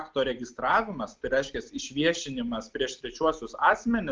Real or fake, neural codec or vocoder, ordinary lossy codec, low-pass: real; none; Opus, 24 kbps; 7.2 kHz